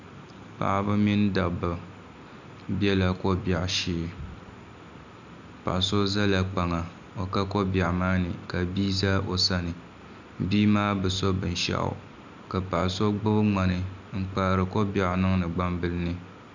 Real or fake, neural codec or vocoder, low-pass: real; none; 7.2 kHz